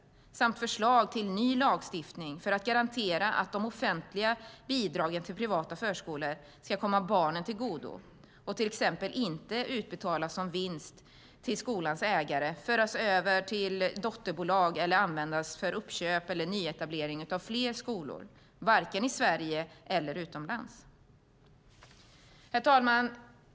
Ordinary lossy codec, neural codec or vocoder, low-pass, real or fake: none; none; none; real